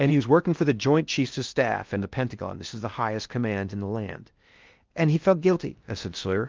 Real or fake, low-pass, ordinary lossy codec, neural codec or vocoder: fake; 7.2 kHz; Opus, 24 kbps; codec, 16 kHz in and 24 kHz out, 0.6 kbps, FocalCodec, streaming, 2048 codes